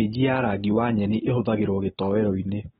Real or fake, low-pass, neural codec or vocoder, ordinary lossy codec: real; 7.2 kHz; none; AAC, 16 kbps